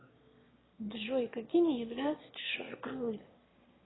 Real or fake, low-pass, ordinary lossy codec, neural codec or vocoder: fake; 7.2 kHz; AAC, 16 kbps; autoencoder, 22.05 kHz, a latent of 192 numbers a frame, VITS, trained on one speaker